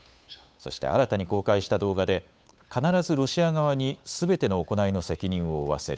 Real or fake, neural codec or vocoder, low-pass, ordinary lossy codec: fake; codec, 16 kHz, 8 kbps, FunCodec, trained on Chinese and English, 25 frames a second; none; none